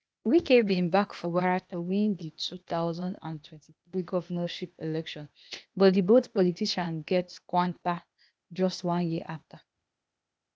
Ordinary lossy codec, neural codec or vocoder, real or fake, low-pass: none; codec, 16 kHz, 0.8 kbps, ZipCodec; fake; none